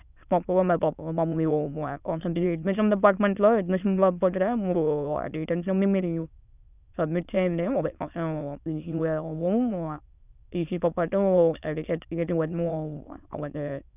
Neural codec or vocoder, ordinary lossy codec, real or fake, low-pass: autoencoder, 22.05 kHz, a latent of 192 numbers a frame, VITS, trained on many speakers; none; fake; 3.6 kHz